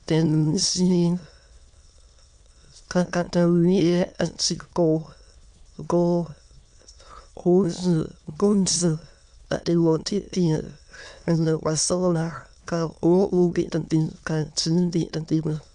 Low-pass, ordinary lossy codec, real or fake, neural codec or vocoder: 9.9 kHz; Opus, 64 kbps; fake; autoencoder, 22.05 kHz, a latent of 192 numbers a frame, VITS, trained on many speakers